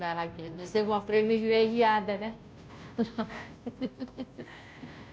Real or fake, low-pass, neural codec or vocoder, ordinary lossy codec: fake; none; codec, 16 kHz, 0.5 kbps, FunCodec, trained on Chinese and English, 25 frames a second; none